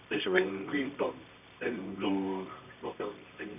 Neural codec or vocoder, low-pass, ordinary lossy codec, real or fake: codec, 24 kHz, 0.9 kbps, WavTokenizer, medium music audio release; 3.6 kHz; none; fake